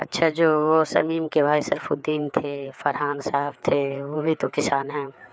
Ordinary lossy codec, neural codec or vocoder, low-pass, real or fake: none; codec, 16 kHz, 4 kbps, FreqCodec, larger model; none; fake